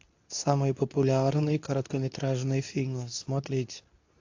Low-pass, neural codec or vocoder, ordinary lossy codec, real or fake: 7.2 kHz; codec, 24 kHz, 0.9 kbps, WavTokenizer, medium speech release version 2; AAC, 48 kbps; fake